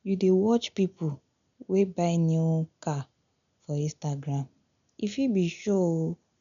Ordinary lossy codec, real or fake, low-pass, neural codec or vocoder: none; real; 7.2 kHz; none